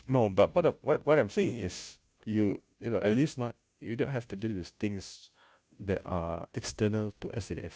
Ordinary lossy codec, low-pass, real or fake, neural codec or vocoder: none; none; fake; codec, 16 kHz, 0.5 kbps, FunCodec, trained on Chinese and English, 25 frames a second